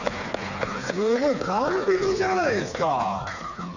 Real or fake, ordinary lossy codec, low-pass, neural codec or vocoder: fake; none; 7.2 kHz; codec, 16 kHz, 4 kbps, FreqCodec, smaller model